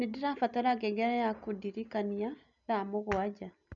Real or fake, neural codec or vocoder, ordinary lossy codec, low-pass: real; none; none; 7.2 kHz